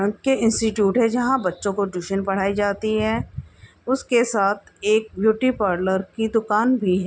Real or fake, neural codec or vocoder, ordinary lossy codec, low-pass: real; none; none; none